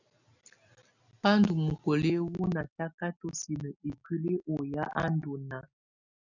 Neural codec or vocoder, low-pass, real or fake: none; 7.2 kHz; real